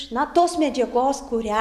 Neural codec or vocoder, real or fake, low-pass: none; real; 14.4 kHz